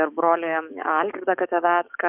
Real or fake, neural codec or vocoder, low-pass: fake; codec, 44.1 kHz, 7.8 kbps, DAC; 3.6 kHz